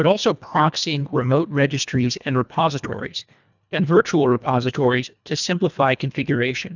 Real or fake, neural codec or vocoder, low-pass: fake; codec, 24 kHz, 1.5 kbps, HILCodec; 7.2 kHz